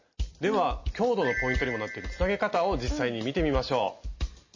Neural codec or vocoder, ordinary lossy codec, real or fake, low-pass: none; MP3, 32 kbps; real; 7.2 kHz